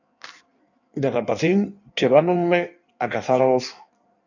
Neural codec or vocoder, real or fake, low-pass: codec, 16 kHz in and 24 kHz out, 1.1 kbps, FireRedTTS-2 codec; fake; 7.2 kHz